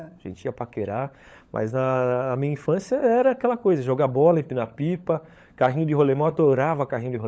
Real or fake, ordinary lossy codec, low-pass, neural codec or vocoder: fake; none; none; codec, 16 kHz, 16 kbps, FunCodec, trained on LibriTTS, 50 frames a second